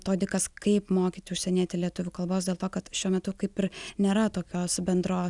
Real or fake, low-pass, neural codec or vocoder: real; 10.8 kHz; none